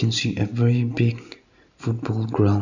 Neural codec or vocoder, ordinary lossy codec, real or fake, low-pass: none; none; real; 7.2 kHz